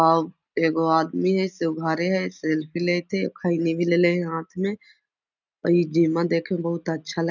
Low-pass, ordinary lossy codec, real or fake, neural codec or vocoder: 7.2 kHz; none; real; none